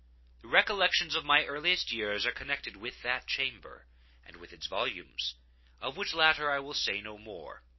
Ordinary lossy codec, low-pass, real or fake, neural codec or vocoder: MP3, 24 kbps; 7.2 kHz; real; none